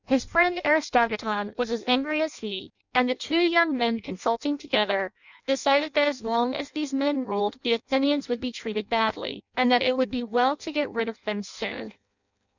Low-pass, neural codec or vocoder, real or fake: 7.2 kHz; codec, 16 kHz in and 24 kHz out, 0.6 kbps, FireRedTTS-2 codec; fake